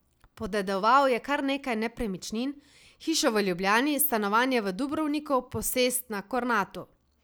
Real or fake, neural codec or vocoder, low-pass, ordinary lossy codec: real; none; none; none